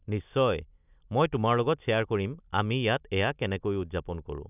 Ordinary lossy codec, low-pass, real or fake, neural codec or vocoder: none; 3.6 kHz; real; none